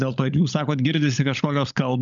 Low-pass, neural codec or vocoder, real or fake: 7.2 kHz; codec, 16 kHz, 16 kbps, FunCodec, trained on LibriTTS, 50 frames a second; fake